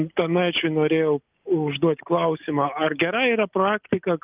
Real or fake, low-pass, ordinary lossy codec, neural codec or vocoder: real; 3.6 kHz; Opus, 24 kbps; none